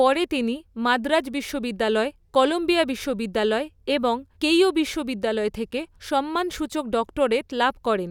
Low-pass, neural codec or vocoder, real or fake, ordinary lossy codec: 19.8 kHz; none; real; none